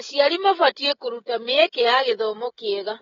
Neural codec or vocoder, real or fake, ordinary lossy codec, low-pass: codec, 16 kHz, 16 kbps, FreqCodec, smaller model; fake; AAC, 24 kbps; 7.2 kHz